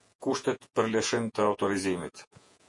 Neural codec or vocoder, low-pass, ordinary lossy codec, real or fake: vocoder, 48 kHz, 128 mel bands, Vocos; 10.8 kHz; MP3, 48 kbps; fake